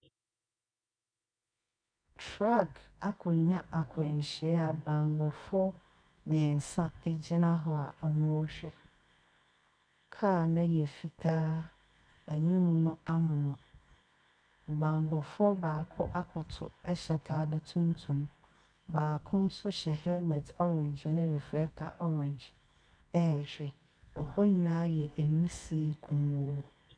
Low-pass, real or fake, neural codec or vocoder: 9.9 kHz; fake; codec, 24 kHz, 0.9 kbps, WavTokenizer, medium music audio release